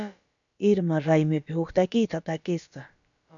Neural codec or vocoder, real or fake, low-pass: codec, 16 kHz, about 1 kbps, DyCAST, with the encoder's durations; fake; 7.2 kHz